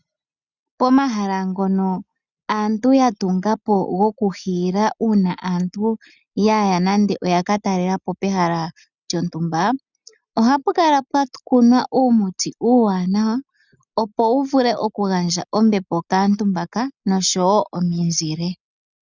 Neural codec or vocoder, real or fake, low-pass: none; real; 7.2 kHz